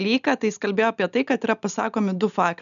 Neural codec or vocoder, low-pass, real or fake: none; 7.2 kHz; real